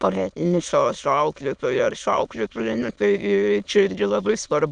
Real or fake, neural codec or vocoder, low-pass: fake; autoencoder, 22.05 kHz, a latent of 192 numbers a frame, VITS, trained on many speakers; 9.9 kHz